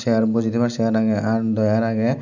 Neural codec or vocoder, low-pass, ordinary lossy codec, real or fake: none; 7.2 kHz; none; real